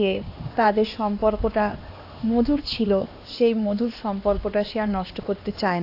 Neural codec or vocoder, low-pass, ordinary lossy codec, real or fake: codec, 16 kHz, 2 kbps, X-Codec, HuBERT features, trained on LibriSpeech; 5.4 kHz; AAC, 32 kbps; fake